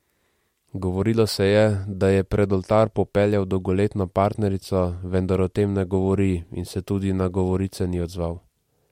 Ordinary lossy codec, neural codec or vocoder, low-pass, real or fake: MP3, 64 kbps; none; 19.8 kHz; real